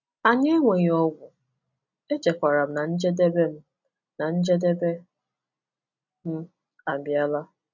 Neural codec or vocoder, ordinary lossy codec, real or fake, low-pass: none; none; real; 7.2 kHz